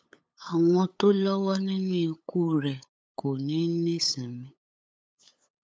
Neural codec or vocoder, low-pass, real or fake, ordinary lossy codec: codec, 16 kHz, 8 kbps, FunCodec, trained on LibriTTS, 25 frames a second; none; fake; none